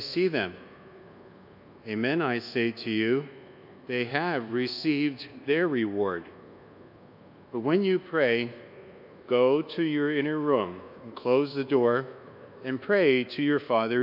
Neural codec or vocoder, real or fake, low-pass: codec, 24 kHz, 1.2 kbps, DualCodec; fake; 5.4 kHz